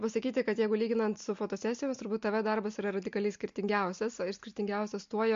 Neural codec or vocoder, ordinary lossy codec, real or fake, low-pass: none; MP3, 48 kbps; real; 7.2 kHz